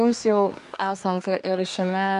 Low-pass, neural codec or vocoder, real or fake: 10.8 kHz; codec, 24 kHz, 1 kbps, SNAC; fake